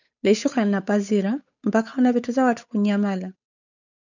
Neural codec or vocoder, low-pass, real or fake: codec, 16 kHz, 8 kbps, FunCodec, trained on Chinese and English, 25 frames a second; 7.2 kHz; fake